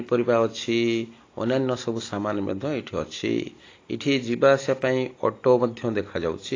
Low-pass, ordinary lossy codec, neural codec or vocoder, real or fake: 7.2 kHz; AAC, 32 kbps; none; real